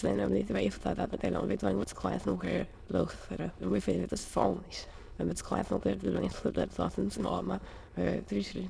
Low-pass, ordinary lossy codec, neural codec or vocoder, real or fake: none; none; autoencoder, 22.05 kHz, a latent of 192 numbers a frame, VITS, trained on many speakers; fake